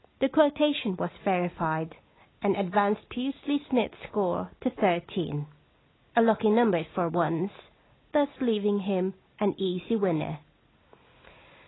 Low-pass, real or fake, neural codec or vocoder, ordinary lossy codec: 7.2 kHz; real; none; AAC, 16 kbps